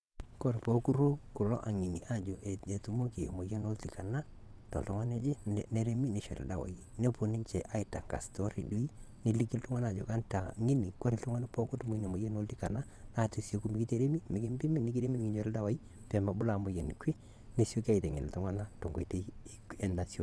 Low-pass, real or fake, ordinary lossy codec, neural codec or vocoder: none; fake; none; vocoder, 22.05 kHz, 80 mel bands, WaveNeXt